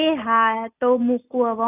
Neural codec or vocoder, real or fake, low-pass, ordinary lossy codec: none; real; 3.6 kHz; none